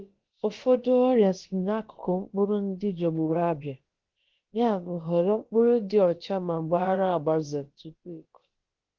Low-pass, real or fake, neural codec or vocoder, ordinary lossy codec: 7.2 kHz; fake; codec, 16 kHz, about 1 kbps, DyCAST, with the encoder's durations; Opus, 16 kbps